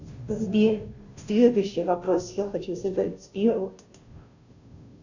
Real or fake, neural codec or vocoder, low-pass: fake; codec, 16 kHz, 0.5 kbps, FunCodec, trained on Chinese and English, 25 frames a second; 7.2 kHz